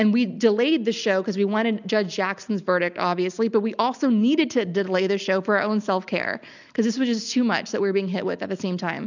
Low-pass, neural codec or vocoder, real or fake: 7.2 kHz; none; real